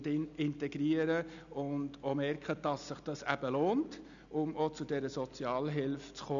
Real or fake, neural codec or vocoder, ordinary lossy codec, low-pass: real; none; none; 7.2 kHz